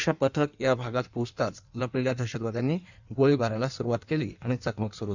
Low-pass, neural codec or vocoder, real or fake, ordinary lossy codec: 7.2 kHz; codec, 16 kHz in and 24 kHz out, 1.1 kbps, FireRedTTS-2 codec; fake; none